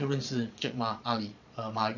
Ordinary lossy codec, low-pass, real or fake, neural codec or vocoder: none; 7.2 kHz; fake; vocoder, 22.05 kHz, 80 mel bands, Vocos